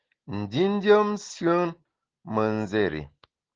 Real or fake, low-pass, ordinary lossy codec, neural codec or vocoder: real; 7.2 kHz; Opus, 16 kbps; none